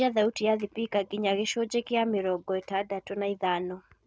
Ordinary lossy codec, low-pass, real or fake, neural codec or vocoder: none; none; real; none